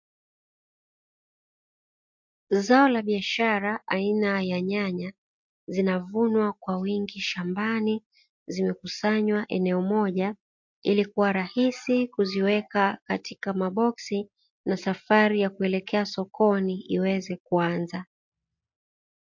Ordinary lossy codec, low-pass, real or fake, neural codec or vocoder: MP3, 48 kbps; 7.2 kHz; real; none